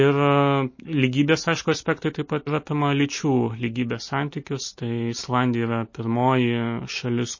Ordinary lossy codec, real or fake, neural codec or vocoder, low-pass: MP3, 32 kbps; real; none; 7.2 kHz